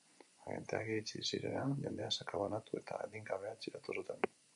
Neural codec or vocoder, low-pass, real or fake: vocoder, 24 kHz, 100 mel bands, Vocos; 10.8 kHz; fake